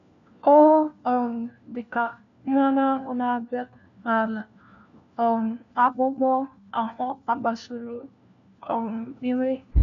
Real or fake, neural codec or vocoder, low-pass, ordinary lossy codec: fake; codec, 16 kHz, 1 kbps, FunCodec, trained on LibriTTS, 50 frames a second; 7.2 kHz; none